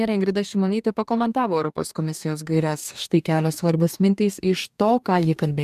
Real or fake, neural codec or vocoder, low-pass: fake; codec, 44.1 kHz, 2.6 kbps, DAC; 14.4 kHz